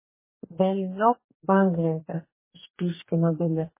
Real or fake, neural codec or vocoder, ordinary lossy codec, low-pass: fake; codec, 44.1 kHz, 2.6 kbps, DAC; MP3, 16 kbps; 3.6 kHz